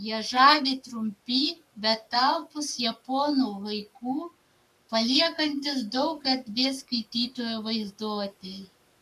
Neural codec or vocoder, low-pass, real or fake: codec, 44.1 kHz, 7.8 kbps, Pupu-Codec; 14.4 kHz; fake